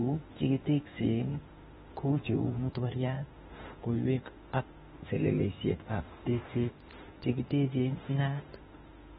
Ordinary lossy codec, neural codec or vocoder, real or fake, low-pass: AAC, 16 kbps; autoencoder, 48 kHz, 32 numbers a frame, DAC-VAE, trained on Japanese speech; fake; 19.8 kHz